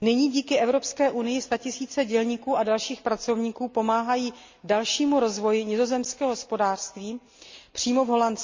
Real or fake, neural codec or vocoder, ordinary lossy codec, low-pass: real; none; MP3, 48 kbps; 7.2 kHz